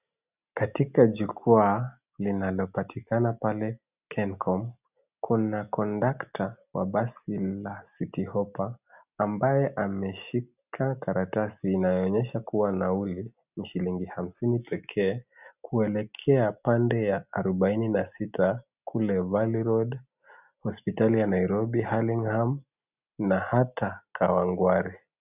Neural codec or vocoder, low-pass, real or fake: none; 3.6 kHz; real